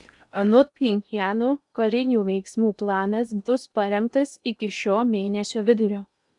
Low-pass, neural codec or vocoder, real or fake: 10.8 kHz; codec, 16 kHz in and 24 kHz out, 0.8 kbps, FocalCodec, streaming, 65536 codes; fake